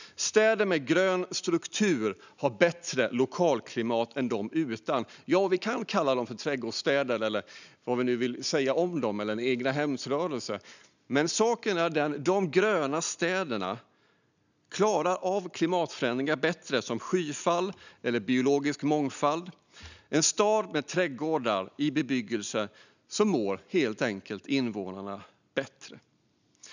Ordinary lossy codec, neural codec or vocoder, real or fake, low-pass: none; none; real; 7.2 kHz